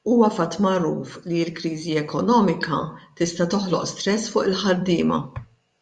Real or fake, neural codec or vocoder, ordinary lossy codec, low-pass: fake; vocoder, 24 kHz, 100 mel bands, Vocos; Opus, 64 kbps; 10.8 kHz